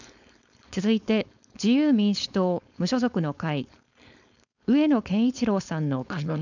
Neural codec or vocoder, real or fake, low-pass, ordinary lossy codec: codec, 16 kHz, 4.8 kbps, FACodec; fake; 7.2 kHz; none